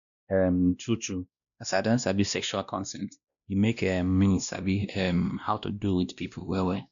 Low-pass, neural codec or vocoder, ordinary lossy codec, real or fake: 7.2 kHz; codec, 16 kHz, 1 kbps, X-Codec, WavLM features, trained on Multilingual LibriSpeech; none; fake